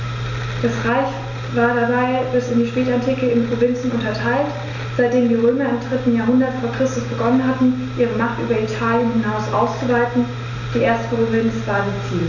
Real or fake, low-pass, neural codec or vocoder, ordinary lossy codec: real; 7.2 kHz; none; none